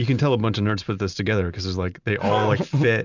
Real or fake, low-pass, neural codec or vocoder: real; 7.2 kHz; none